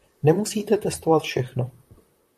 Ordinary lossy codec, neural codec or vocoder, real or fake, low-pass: MP3, 64 kbps; vocoder, 44.1 kHz, 128 mel bands, Pupu-Vocoder; fake; 14.4 kHz